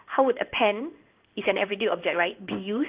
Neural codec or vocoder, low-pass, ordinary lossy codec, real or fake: codec, 16 kHz in and 24 kHz out, 1 kbps, XY-Tokenizer; 3.6 kHz; Opus, 24 kbps; fake